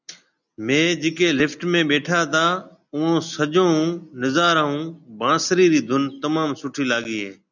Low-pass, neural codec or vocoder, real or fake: 7.2 kHz; none; real